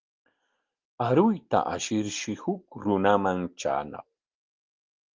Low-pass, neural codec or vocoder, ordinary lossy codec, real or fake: 7.2 kHz; none; Opus, 32 kbps; real